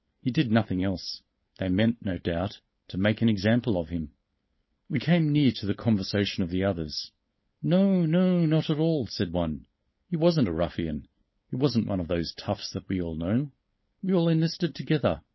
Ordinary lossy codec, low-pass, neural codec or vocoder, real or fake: MP3, 24 kbps; 7.2 kHz; codec, 16 kHz, 4.8 kbps, FACodec; fake